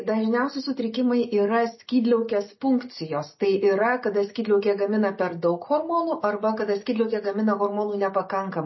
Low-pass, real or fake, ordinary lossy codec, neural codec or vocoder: 7.2 kHz; real; MP3, 24 kbps; none